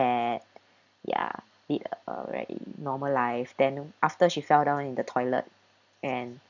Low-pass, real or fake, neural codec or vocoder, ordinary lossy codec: 7.2 kHz; real; none; none